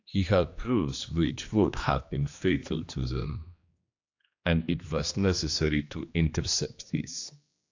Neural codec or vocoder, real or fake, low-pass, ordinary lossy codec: codec, 16 kHz, 2 kbps, X-Codec, HuBERT features, trained on general audio; fake; 7.2 kHz; AAC, 48 kbps